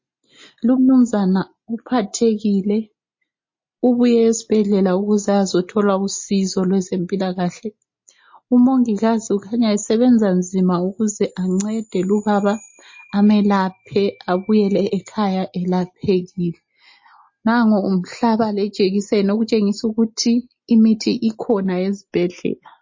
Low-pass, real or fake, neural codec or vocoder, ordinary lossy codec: 7.2 kHz; real; none; MP3, 32 kbps